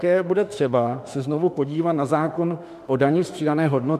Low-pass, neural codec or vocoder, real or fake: 14.4 kHz; autoencoder, 48 kHz, 32 numbers a frame, DAC-VAE, trained on Japanese speech; fake